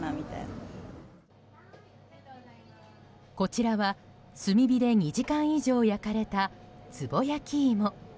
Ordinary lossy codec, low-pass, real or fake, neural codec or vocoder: none; none; real; none